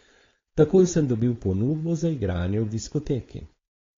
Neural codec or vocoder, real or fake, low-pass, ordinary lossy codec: codec, 16 kHz, 4.8 kbps, FACodec; fake; 7.2 kHz; AAC, 32 kbps